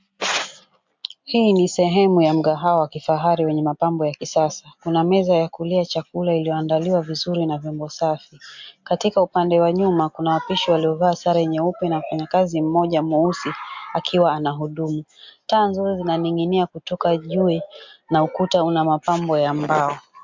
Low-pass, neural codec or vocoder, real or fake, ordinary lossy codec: 7.2 kHz; none; real; MP3, 64 kbps